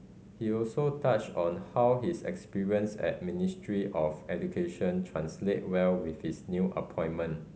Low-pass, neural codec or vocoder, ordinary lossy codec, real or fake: none; none; none; real